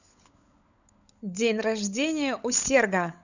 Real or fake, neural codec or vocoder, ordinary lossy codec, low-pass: fake; codec, 16 kHz, 16 kbps, FunCodec, trained on LibriTTS, 50 frames a second; none; 7.2 kHz